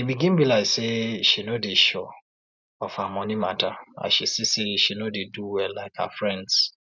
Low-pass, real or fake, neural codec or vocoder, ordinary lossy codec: 7.2 kHz; real; none; none